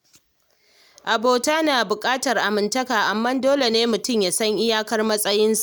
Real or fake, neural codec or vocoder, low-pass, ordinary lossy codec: real; none; none; none